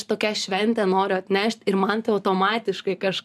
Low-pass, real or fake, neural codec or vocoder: 14.4 kHz; fake; vocoder, 44.1 kHz, 128 mel bands every 512 samples, BigVGAN v2